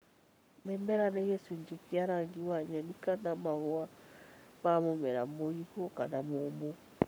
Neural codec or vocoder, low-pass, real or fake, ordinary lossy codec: codec, 44.1 kHz, 7.8 kbps, Pupu-Codec; none; fake; none